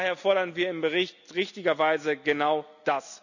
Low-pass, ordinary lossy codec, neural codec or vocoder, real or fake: 7.2 kHz; none; none; real